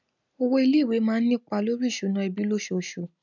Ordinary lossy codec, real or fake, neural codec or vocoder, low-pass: none; real; none; 7.2 kHz